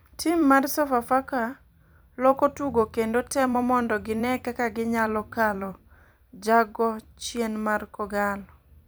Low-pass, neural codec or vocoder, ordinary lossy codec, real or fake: none; vocoder, 44.1 kHz, 128 mel bands every 256 samples, BigVGAN v2; none; fake